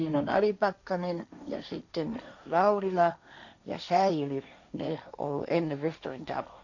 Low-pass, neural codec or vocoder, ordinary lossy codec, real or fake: 7.2 kHz; codec, 16 kHz, 1.1 kbps, Voila-Tokenizer; none; fake